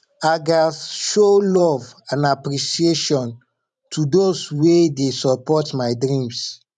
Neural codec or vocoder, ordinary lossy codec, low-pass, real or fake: vocoder, 44.1 kHz, 128 mel bands every 512 samples, BigVGAN v2; none; 10.8 kHz; fake